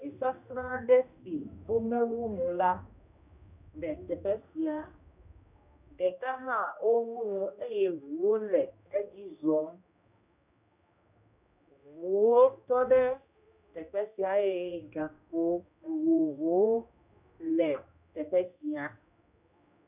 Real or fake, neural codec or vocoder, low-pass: fake; codec, 16 kHz, 1 kbps, X-Codec, HuBERT features, trained on general audio; 3.6 kHz